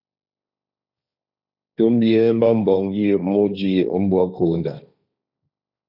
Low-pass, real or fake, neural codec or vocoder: 5.4 kHz; fake; codec, 16 kHz, 1.1 kbps, Voila-Tokenizer